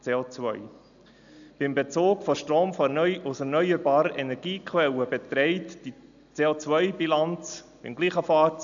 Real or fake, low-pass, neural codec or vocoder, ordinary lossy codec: real; 7.2 kHz; none; none